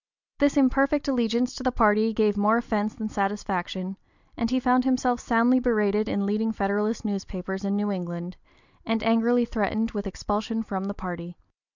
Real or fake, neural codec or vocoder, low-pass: real; none; 7.2 kHz